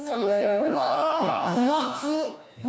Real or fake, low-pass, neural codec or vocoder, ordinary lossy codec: fake; none; codec, 16 kHz, 1 kbps, FunCodec, trained on LibriTTS, 50 frames a second; none